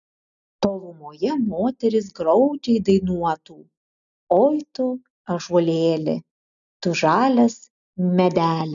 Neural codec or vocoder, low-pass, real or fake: none; 7.2 kHz; real